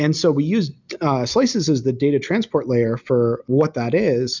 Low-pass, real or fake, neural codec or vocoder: 7.2 kHz; real; none